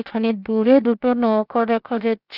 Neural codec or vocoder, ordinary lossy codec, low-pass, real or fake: codec, 16 kHz, about 1 kbps, DyCAST, with the encoder's durations; none; 5.4 kHz; fake